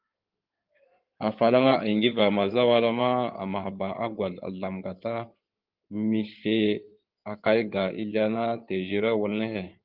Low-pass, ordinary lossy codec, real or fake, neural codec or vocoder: 5.4 kHz; Opus, 32 kbps; fake; codec, 16 kHz in and 24 kHz out, 2.2 kbps, FireRedTTS-2 codec